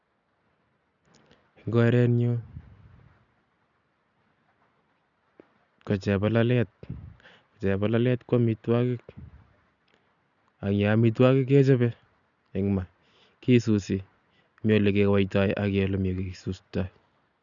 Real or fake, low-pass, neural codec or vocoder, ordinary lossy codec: real; 7.2 kHz; none; none